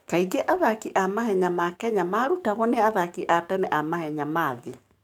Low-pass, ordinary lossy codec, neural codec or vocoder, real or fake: 19.8 kHz; none; codec, 44.1 kHz, 7.8 kbps, DAC; fake